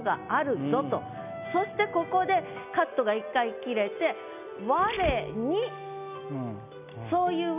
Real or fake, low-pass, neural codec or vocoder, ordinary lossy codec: real; 3.6 kHz; none; none